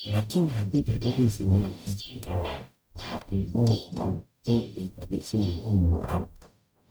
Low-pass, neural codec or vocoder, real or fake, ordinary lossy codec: none; codec, 44.1 kHz, 0.9 kbps, DAC; fake; none